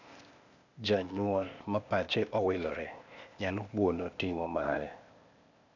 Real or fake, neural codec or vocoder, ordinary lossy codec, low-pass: fake; codec, 16 kHz, 0.8 kbps, ZipCodec; none; 7.2 kHz